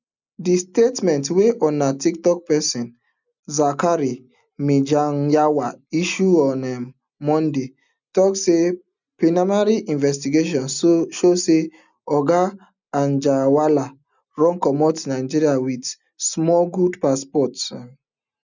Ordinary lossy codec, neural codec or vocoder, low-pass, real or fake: none; none; 7.2 kHz; real